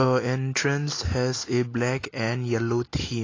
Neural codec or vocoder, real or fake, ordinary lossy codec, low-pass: none; real; AAC, 32 kbps; 7.2 kHz